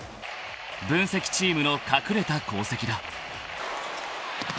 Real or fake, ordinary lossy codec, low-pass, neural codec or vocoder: real; none; none; none